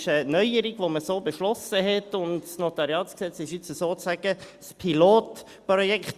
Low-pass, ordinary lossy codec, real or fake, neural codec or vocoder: 14.4 kHz; Opus, 64 kbps; real; none